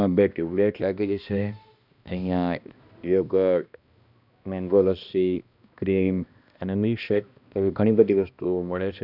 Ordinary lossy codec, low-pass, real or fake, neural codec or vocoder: none; 5.4 kHz; fake; codec, 16 kHz, 1 kbps, X-Codec, HuBERT features, trained on balanced general audio